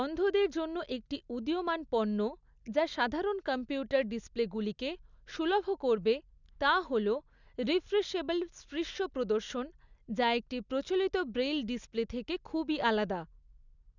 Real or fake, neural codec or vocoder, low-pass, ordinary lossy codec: real; none; 7.2 kHz; none